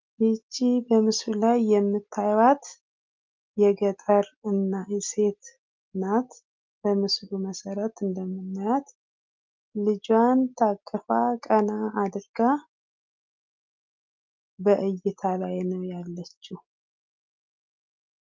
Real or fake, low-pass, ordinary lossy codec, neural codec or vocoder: real; 7.2 kHz; Opus, 24 kbps; none